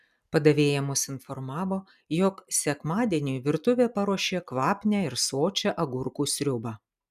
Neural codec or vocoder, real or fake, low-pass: none; real; 14.4 kHz